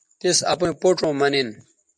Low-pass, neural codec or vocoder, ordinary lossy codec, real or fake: 9.9 kHz; none; MP3, 96 kbps; real